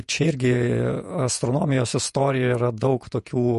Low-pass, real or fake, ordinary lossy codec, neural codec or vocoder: 14.4 kHz; fake; MP3, 48 kbps; vocoder, 48 kHz, 128 mel bands, Vocos